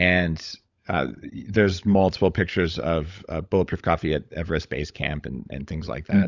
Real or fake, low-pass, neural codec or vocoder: fake; 7.2 kHz; codec, 16 kHz, 16 kbps, FunCodec, trained on LibriTTS, 50 frames a second